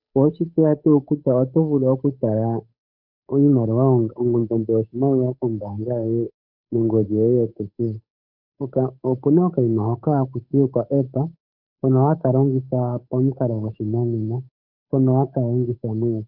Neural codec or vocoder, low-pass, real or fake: codec, 16 kHz, 8 kbps, FunCodec, trained on Chinese and English, 25 frames a second; 5.4 kHz; fake